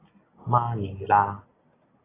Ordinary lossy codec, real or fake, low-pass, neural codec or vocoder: AAC, 16 kbps; real; 3.6 kHz; none